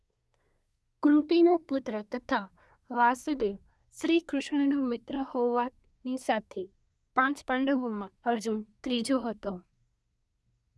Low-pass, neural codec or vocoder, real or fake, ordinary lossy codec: none; codec, 24 kHz, 1 kbps, SNAC; fake; none